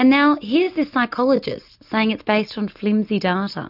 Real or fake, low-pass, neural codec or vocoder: real; 5.4 kHz; none